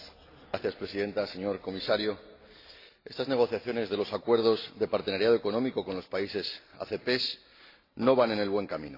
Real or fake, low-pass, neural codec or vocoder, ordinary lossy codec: real; 5.4 kHz; none; AAC, 32 kbps